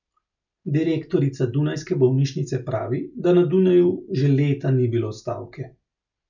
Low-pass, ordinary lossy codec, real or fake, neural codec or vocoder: 7.2 kHz; none; real; none